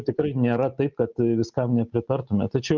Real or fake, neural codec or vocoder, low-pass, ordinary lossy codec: real; none; 7.2 kHz; Opus, 24 kbps